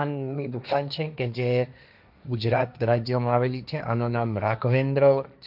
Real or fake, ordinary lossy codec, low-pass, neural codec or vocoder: fake; none; 5.4 kHz; codec, 16 kHz, 1.1 kbps, Voila-Tokenizer